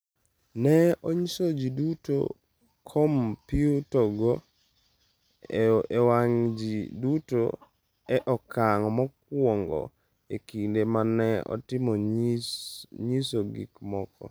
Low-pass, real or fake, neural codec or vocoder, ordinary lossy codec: none; real; none; none